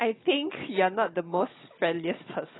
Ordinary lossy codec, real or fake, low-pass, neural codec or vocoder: AAC, 16 kbps; fake; 7.2 kHz; codec, 16 kHz, 16 kbps, FunCodec, trained on Chinese and English, 50 frames a second